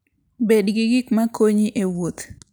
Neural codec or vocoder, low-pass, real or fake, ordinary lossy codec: none; none; real; none